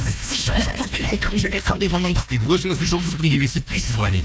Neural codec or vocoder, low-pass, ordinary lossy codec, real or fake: codec, 16 kHz, 1 kbps, FunCodec, trained on Chinese and English, 50 frames a second; none; none; fake